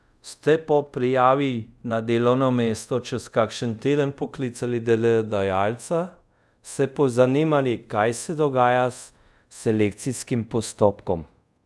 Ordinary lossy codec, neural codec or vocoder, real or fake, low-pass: none; codec, 24 kHz, 0.5 kbps, DualCodec; fake; none